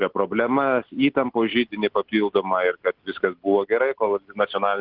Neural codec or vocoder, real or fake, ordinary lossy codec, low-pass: none; real; Opus, 16 kbps; 5.4 kHz